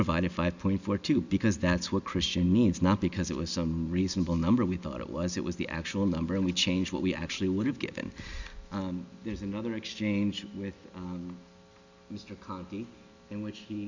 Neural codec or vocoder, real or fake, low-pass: none; real; 7.2 kHz